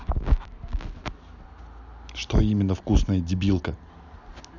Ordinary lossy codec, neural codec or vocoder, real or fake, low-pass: none; none; real; 7.2 kHz